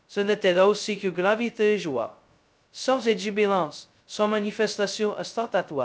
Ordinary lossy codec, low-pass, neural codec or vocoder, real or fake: none; none; codec, 16 kHz, 0.2 kbps, FocalCodec; fake